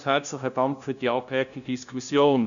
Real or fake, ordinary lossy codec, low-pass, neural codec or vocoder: fake; none; 7.2 kHz; codec, 16 kHz, 0.5 kbps, FunCodec, trained on LibriTTS, 25 frames a second